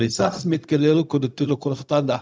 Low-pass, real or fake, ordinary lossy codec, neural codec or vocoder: none; fake; none; codec, 16 kHz, 0.4 kbps, LongCat-Audio-Codec